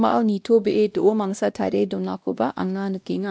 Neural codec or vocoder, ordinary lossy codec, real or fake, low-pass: codec, 16 kHz, 1 kbps, X-Codec, WavLM features, trained on Multilingual LibriSpeech; none; fake; none